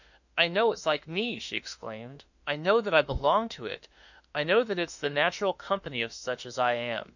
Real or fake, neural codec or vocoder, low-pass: fake; autoencoder, 48 kHz, 32 numbers a frame, DAC-VAE, trained on Japanese speech; 7.2 kHz